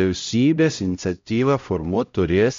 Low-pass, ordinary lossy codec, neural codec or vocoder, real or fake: 7.2 kHz; MP3, 48 kbps; codec, 16 kHz, 0.5 kbps, X-Codec, HuBERT features, trained on LibriSpeech; fake